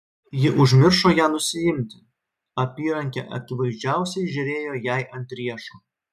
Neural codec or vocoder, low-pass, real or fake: none; 14.4 kHz; real